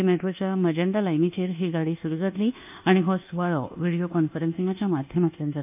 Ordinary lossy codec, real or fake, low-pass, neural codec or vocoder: AAC, 32 kbps; fake; 3.6 kHz; codec, 24 kHz, 1.2 kbps, DualCodec